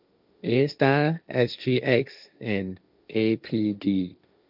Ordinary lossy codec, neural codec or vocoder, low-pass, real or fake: none; codec, 16 kHz, 1.1 kbps, Voila-Tokenizer; 5.4 kHz; fake